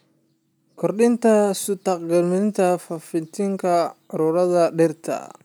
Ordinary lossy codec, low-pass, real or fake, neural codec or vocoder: none; none; real; none